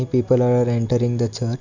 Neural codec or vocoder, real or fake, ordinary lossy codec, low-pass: none; real; none; 7.2 kHz